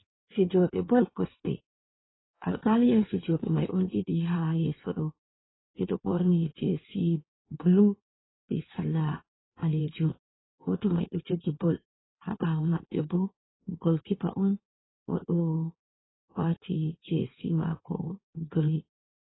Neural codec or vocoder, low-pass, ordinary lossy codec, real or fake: codec, 16 kHz in and 24 kHz out, 1.1 kbps, FireRedTTS-2 codec; 7.2 kHz; AAC, 16 kbps; fake